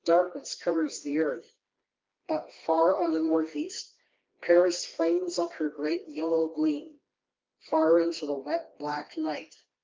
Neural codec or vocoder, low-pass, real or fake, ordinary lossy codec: codec, 16 kHz, 2 kbps, FreqCodec, smaller model; 7.2 kHz; fake; Opus, 24 kbps